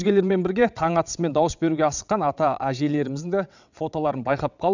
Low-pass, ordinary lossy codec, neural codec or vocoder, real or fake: 7.2 kHz; none; vocoder, 22.05 kHz, 80 mel bands, WaveNeXt; fake